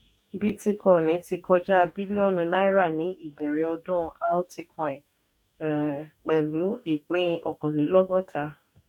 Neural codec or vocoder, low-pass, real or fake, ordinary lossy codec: codec, 44.1 kHz, 2.6 kbps, DAC; 19.8 kHz; fake; none